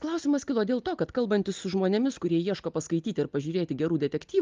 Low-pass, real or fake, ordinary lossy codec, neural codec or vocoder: 7.2 kHz; real; Opus, 32 kbps; none